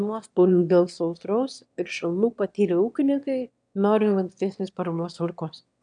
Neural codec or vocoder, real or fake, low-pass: autoencoder, 22.05 kHz, a latent of 192 numbers a frame, VITS, trained on one speaker; fake; 9.9 kHz